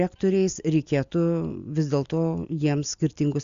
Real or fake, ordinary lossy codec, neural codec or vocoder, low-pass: real; Opus, 64 kbps; none; 7.2 kHz